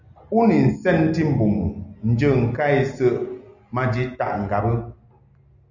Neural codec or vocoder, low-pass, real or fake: none; 7.2 kHz; real